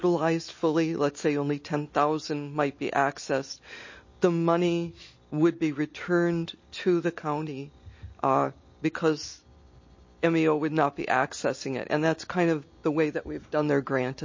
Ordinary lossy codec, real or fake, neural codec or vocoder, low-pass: MP3, 32 kbps; real; none; 7.2 kHz